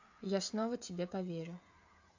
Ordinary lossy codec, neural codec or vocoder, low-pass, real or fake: AAC, 48 kbps; codec, 16 kHz, 16 kbps, FreqCodec, smaller model; 7.2 kHz; fake